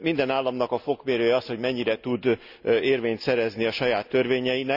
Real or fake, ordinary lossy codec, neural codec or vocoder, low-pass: real; none; none; 5.4 kHz